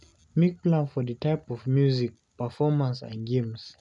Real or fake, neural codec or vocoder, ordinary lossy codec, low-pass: real; none; none; 10.8 kHz